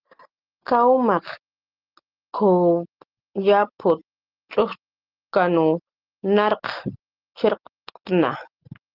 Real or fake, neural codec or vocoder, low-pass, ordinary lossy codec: real; none; 5.4 kHz; Opus, 16 kbps